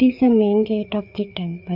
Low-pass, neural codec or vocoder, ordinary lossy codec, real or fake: 5.4 kHz; codec, 16 kHz, 4 kbps, FreqCodec, larger model; none; fake